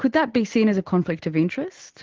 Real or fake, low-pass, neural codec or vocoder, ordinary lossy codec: real; 7.2 kHz; none; Opus, 16 kbps